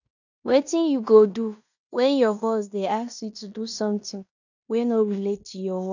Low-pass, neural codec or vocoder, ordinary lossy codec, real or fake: 7.2 kHz; codec, 16 kHz in and 24 kHz out, 0.9 kbps, LongCat-Audio-Codec, fine tuned four codebook decoder; none; fake